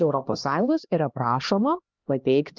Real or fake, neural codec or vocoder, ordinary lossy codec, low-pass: fake; codec, 16 kHz, 1 kbps, X-Codec, HuBERT features, trained on LibriSpeech; Opus, 24 kbps; 7.2 kHz